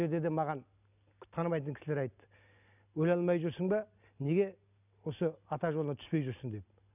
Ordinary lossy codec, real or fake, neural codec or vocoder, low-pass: none; real; none; 3.6 kHz